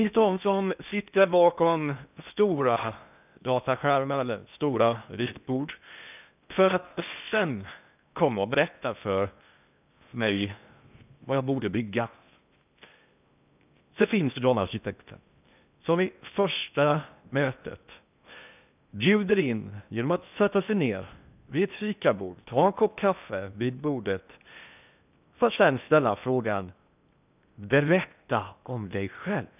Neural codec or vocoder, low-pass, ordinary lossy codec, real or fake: codec, 16 kHz in and 24 kHz out, 0.6 kbps, FocalCodec, streaming, 4096 codes; 3.6 kHz; none; fake